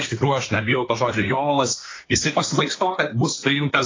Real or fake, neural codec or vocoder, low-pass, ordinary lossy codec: fake; codec, 24 kHz, 1 kbps, SNAC; 7.2 kHz; AAC, 32 kbps